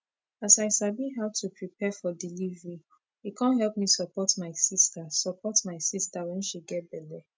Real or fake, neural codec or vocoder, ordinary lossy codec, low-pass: real; none; none; none